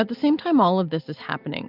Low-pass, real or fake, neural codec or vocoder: 5.4 kHz; real; none